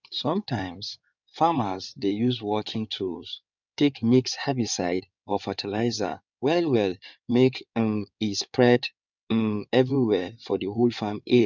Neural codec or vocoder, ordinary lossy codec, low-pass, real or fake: codec, 16 kHz in and 24 kHz out, 2.2 kbps, FireRedTTS-2 codec; none; 7.2 kHz; fake